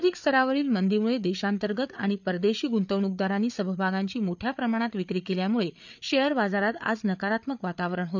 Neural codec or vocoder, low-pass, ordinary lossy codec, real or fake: codec, 16 kHz, 8 kbps, FreqCodec, larger model; 7.2 kHz; none; fake